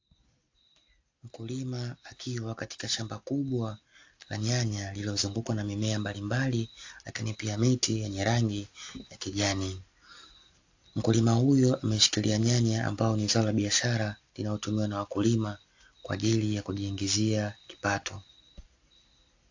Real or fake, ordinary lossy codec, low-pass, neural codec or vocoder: real; AAC, 48 kbps; 7.2 kHz; none